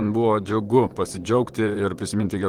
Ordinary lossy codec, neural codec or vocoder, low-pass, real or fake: Opus, 24 kbps; vocoder, 44.1 kHz, 128 mel bands, Pupu-Vocoder; 19.8 kHz; fake